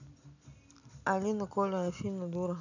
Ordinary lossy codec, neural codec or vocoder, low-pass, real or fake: none; none; 7.2 kHz; real